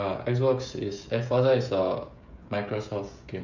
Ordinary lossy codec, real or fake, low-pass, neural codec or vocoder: none; fake; 7.2 kHz; codec, 16 kHz, 16 kbps, FreqCodec, smaller model